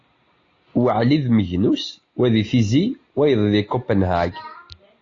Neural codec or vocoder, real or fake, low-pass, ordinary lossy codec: none; real; 7.2 kHz; AAC, 32 kbps